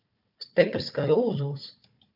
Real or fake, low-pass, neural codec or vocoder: fake; 5.4 kHz; codec, 16 kHz, 4 kbps, FunCodec, trained on Chinese and English, 50 frames a second